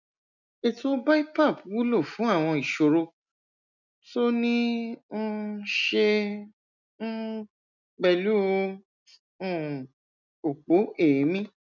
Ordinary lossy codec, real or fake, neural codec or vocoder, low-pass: none; real; none; 7.2 kHz